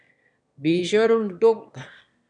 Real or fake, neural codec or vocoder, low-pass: fake; autoencoder, 22.05 kHz, a latent of 192 numbers a frame, VITS, trained on one speaker; 9.9 kHz